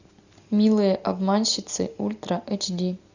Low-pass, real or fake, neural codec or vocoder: 7.2 kHz; real; none